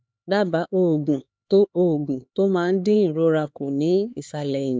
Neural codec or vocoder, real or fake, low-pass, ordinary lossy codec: codec, 16 kHz, 4 kbps, X-Codec, HuBERT features, trained on LibriSpeech; fake; none; none